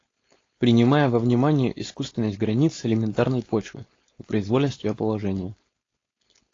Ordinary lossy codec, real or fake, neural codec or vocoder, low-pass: AAC, 32 kbps; fake; codec, 16 kHz, 4.8 kbps, FACodec; 7.2 kHz